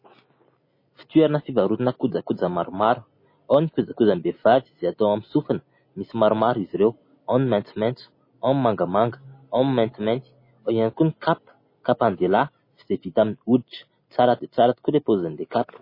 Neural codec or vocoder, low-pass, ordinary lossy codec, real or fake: none; 5.4 kHz; MP3, 24 kbps; real